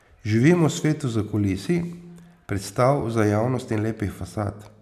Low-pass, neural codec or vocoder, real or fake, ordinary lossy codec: 14.4 kHz; none; real; none